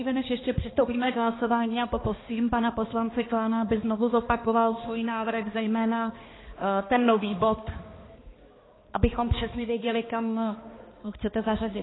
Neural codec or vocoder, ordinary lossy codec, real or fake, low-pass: codec, 16 kHz, 2 kbps, X-Codec, HuBERT features, trained on balanced general audio; AAC, 16 kbps; fake; 7.2 kHz